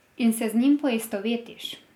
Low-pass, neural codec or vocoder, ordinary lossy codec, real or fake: 19.8 kHz; none; none; real